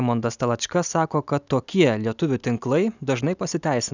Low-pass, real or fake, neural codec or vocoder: 7.2 kHz; real; none